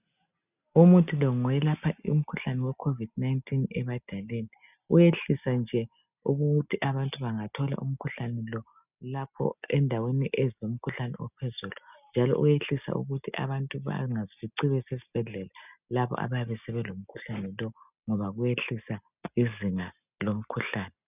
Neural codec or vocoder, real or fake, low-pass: none; real; 3.6 kHz